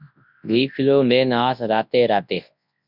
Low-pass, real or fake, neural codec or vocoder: 5.4 kHz; fake; codec, 24 kHz, 0.9 kbps, WavTokenizer, large speech release